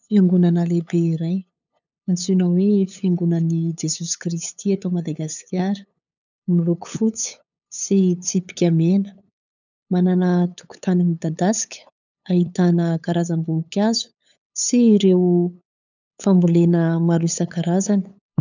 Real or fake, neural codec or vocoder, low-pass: fake; codec, 16 kHz, 8 kbps, FunCodec, trained on LibriTTS, 25 frames a second; 7.2 kHz